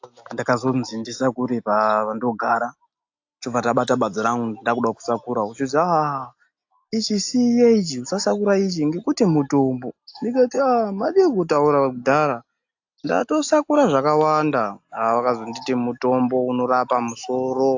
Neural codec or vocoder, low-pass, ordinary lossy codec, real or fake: none; 7.2 kHz; AAC, 48 kbps; real